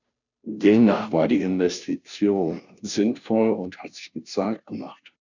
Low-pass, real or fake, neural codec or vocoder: 7.2 kHz; fake; codec, 16 kHz, 0.5 kbps, FunCodec, trained on Chinese and English, 25 frames a second